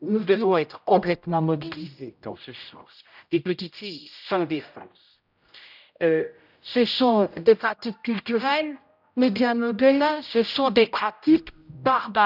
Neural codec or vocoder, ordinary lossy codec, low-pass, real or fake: codec, 16 kHz, 0.5 kbps, X-Codec, HuBERT features, trained on general audio; none; 5.4 kHz; fake